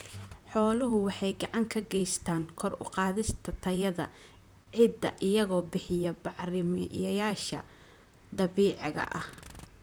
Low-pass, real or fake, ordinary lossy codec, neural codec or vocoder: none; fake; none; vocoder, 44.1 kHz, 128 mel bands, Pupu-Vocoder